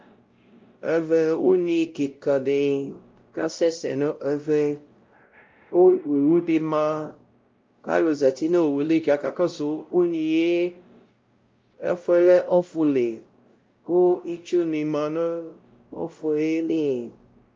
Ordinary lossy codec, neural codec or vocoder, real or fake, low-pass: Opus, 24 kbps; codec, 16 kHz, 0.5 kbps, X-Codec, WavLM features, trained on Multilingual LibriSpeech; fake; 7.2 kHz